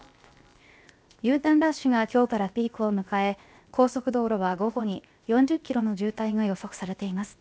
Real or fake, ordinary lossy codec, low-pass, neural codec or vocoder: fake; none; none; codec, 16 kHz, 0.7 kbps, FocalCodec